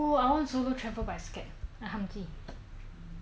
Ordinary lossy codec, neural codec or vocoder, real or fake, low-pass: none; none; real; none